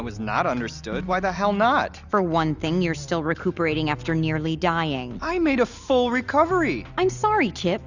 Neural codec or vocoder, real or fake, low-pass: none; real; 7.2 kHz